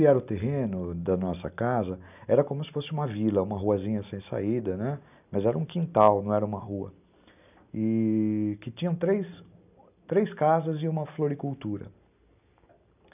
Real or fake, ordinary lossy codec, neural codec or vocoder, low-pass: real; none; none; 3.6 kHz